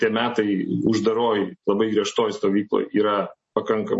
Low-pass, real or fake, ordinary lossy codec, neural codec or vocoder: 10.8 kHz; real; MP3, 32 kbps; none